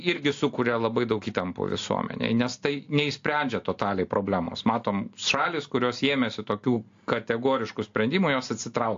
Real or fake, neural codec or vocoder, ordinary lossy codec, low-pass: real; none; AAC, 48 kbps; 7.2 kHz